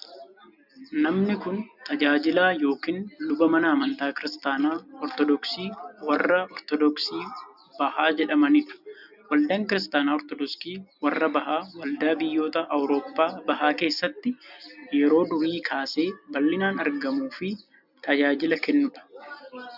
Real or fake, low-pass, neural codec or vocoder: real; 5.4 kHz; none